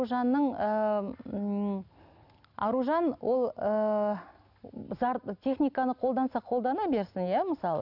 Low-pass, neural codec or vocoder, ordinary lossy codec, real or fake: 5.4 kHz; none; none; real